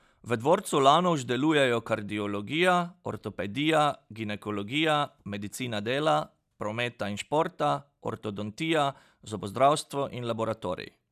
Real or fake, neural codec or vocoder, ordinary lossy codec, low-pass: real; none; none; 14.4 kHz